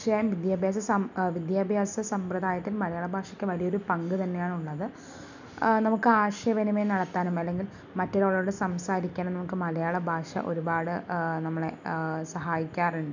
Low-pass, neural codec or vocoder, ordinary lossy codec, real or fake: 7.2 kHz; none; none; real